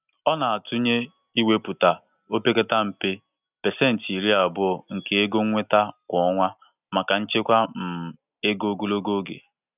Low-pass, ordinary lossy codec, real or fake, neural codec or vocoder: 3.6 kHz; none; real; none